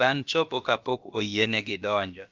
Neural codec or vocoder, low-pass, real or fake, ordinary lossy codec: codec, 16 kHz, about 1 kbps, DyCAST, with the encoder's durations; 7.2 kHz; fake; Opus, 24 kbps